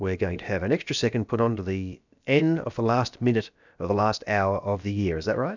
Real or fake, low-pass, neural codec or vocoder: fake; 7.2 kHz; codec, 16 kHz, about 1 kbps, DyCAST, with the encoder's durations